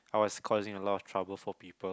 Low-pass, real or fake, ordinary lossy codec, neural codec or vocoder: none; real; none; none